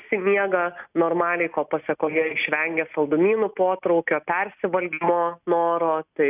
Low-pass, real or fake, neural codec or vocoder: 3.6 kHz; real; none